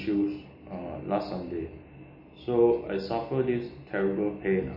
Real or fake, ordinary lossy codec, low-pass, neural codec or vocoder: real; MP3, 24 kbps; 5.4 kHz; none